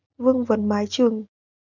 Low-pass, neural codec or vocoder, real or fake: 7.2 kHz; none; real